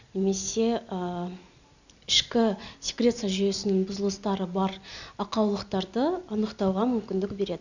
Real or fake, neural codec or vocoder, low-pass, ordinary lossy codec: real; none; 7.2 kHz; none